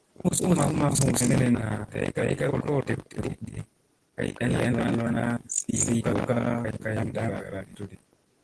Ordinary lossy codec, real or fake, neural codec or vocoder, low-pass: Opus, 16 kbps; real; none; 10.8 kHz